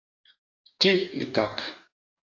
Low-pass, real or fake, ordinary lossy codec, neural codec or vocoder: 7.2 kHz; fake; AAC, 48 kbps; codec, 44.1 kHz, 2.6 kbps, DAC